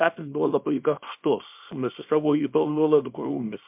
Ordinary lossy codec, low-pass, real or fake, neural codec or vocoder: MP3, 32 kbps; 3.6 kHz; fake; codec, 24 kHz, 0.9 kbps, WavTokenizer, small release